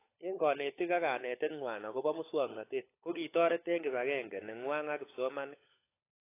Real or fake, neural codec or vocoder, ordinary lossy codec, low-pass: fake; codec, 16 kHz, 16 kbps, FunCodec, trained on LibriTTS, 50 frames a second; AAC, 24 kbps; 3.6 kHz